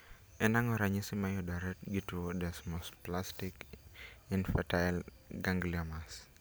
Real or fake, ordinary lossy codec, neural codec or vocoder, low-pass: real; none; none; none